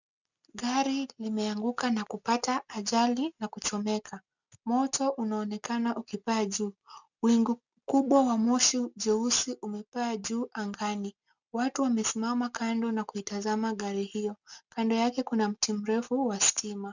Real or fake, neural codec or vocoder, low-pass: real; none; 7.2 kHz